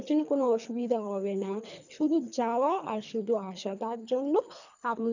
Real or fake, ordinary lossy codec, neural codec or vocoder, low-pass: fake; none; codec, 24 kHz, 3 kbps, HILCodec; 7.2 kHz